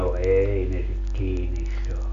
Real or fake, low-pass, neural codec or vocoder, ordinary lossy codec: real; 7.2 kHz; none; none